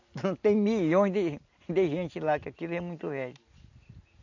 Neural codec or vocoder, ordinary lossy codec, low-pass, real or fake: none; AAC, 48 kbps; 7.2 kHz; real